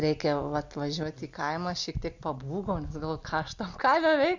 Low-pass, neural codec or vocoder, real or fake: 7.2 kHz; none; real